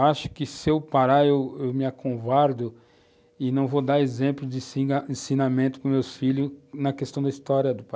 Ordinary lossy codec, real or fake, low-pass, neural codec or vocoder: none; real; none; none